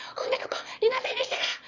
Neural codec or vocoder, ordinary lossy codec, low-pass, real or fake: autoencoder, 22.05 kHz, a latent of 192 numbers a frame, VITS, trained on one speaker; none; 7.2 kHz; fake